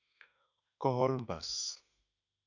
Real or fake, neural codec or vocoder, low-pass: fake; autoencoder, 48 kHz, 32 numbers a frame, DAC-VAE, trained on Japanese speech; 7.2 kHz